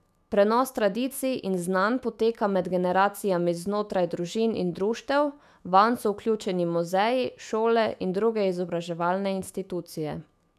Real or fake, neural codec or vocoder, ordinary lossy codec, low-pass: fake; autoencoder, 48 kHz, 128 numbers a frame, DAC-VAE, trained on Japanese speech; none; 14.4 kHz